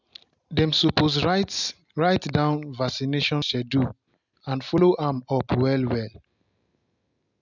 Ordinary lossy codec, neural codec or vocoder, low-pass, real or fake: none; none; 7.2 kHz; real